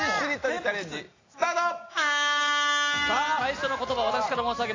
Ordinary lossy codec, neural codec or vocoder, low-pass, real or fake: AAC, 32 kbps; none; 7.2 kHz; real